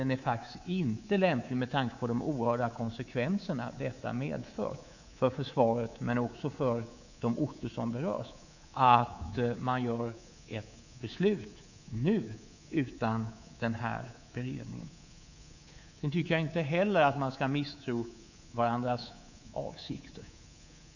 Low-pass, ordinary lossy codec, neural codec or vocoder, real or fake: 7.2 kHz; none; codec, 24 kHz, 3.1 kbps, DualCodec; fake